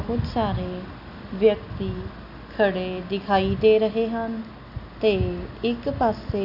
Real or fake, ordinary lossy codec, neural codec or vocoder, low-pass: real; AAC, 48 kbps; none; 5.4 kHz